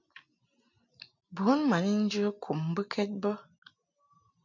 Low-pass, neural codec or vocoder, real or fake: 7.2 kHz; none; real